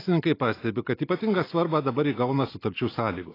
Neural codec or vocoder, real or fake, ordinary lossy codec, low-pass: none; real; AAC, 24 kbps; 5.4 kHz